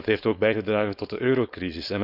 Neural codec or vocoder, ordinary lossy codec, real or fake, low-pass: codec, 16 kHz, 4.8 kbps, FACodec; none; fake; 5.4 kHz